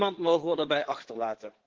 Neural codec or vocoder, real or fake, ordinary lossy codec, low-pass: codec, 16 kHz in and 24 kHz out, 2.2 kbps, FireRedTTS-2 codec; fake; Opus, 16 kbps; 7.2 kHz